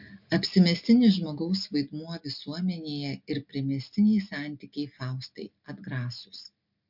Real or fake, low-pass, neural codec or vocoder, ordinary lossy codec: real; 5.4 kHz; none; MP3, 48 kbps